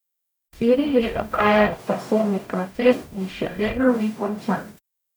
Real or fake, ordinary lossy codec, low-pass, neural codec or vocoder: fake; none; none; codec, 44.1 kHz, 0.9 kbps, DAC